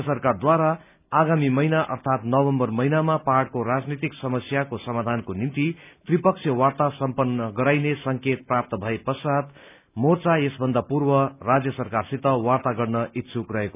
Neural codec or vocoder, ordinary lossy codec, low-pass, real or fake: none; none; 3.6 kHz; real